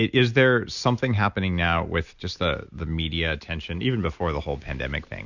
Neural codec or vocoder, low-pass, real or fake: none; 7.2 kHz; real